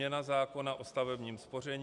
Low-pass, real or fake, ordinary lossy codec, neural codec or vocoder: 10.8 kHz; real; AAC, 64 kbps; none